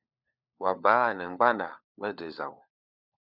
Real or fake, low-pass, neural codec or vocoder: fake; 5.4 kHz; codec, 16 kHz, 4 kbps, FunCodec, trained on LibriTTS, 50 frames a second